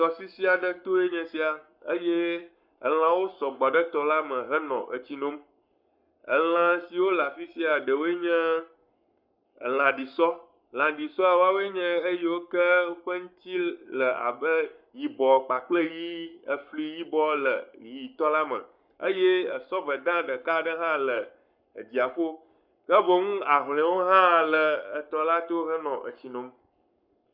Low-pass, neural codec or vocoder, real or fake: 5.4 kHz; codec, 44.1 kHz, 7.8 kbps, Pupu-Codec; fake